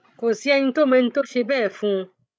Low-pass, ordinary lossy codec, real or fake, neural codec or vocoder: none; none; fake; codec, 16 kHz, 16 kbps, FreqCodec, larger model